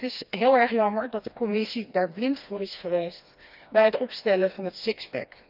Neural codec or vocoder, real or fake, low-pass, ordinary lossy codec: codec, 16 kHz, 2 kbps, FreqCodec, smaller model; fake; 5.4 kHz; none